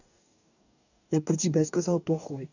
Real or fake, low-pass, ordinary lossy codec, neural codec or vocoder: fake; 7.2 kHz; none; codec, 44.1 kHz, 2.6 kbps, DAC